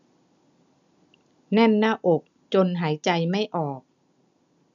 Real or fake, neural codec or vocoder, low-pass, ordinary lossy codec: real; none; 7.2 kHz; none